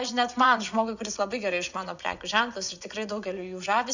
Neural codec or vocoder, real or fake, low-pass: vocoder, 24 kHz, 100 mel bands, Vocos; fake; 7.2 kHz